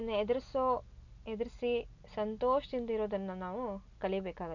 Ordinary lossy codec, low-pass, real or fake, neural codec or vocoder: none; 7.2 kHz; real; none